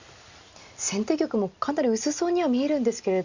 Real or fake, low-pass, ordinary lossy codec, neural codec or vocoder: real; 7.2 kHz; Opus, 64 kbps; none